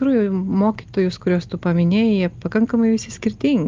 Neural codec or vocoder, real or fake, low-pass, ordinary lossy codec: none; real; 7.2 kHz; Opus, 24 kbps